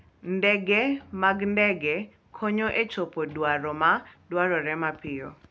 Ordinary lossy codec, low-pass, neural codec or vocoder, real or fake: none; none; none; real